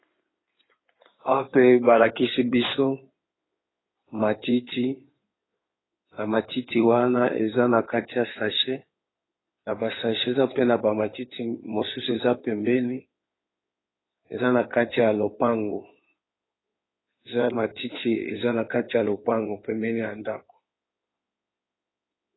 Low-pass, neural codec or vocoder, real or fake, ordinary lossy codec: 7.2 kHz; codec, 16 kHz in and 24 kHz out, 2.2 kbps, FireRedTTS-2 codec; fake; AAC, 16 kbps